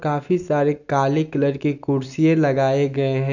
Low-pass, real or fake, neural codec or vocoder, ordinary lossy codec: 7.2 kHz; real; none; none